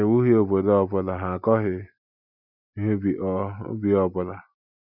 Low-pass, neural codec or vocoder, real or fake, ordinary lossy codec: 5.4 kHz; none; real; none